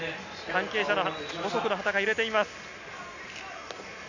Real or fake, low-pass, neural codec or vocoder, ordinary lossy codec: real; 7.2 kHz; none; none